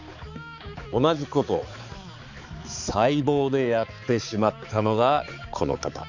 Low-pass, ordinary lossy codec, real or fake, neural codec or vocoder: 7.2 kHz; none; fake; codec, 16 kHz, 4 kbps, X-Codec, HuBERT features, trained on balanced general audio